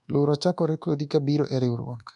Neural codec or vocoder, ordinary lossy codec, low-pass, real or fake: codec, 24 kHz, 1.2 kbps, DualCodec; none; 10.8 kHz; fake